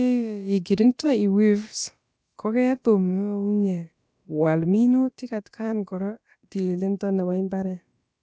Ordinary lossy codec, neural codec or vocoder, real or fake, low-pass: none; codec, 16 kHz, about 1 kbps, DyCAST, with the encoder's durations; fake; none